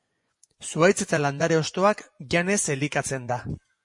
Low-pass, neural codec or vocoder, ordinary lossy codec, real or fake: 10.8 kHz; vocoder, 24 kHz, 100 mel bands, Vocos; MP3, 48 kbps; fake